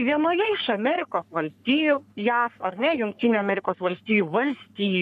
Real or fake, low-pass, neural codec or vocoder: fake; 14.4 kHz; codec, 44.1 kHz, 3.4 kbps, Pupu-Codec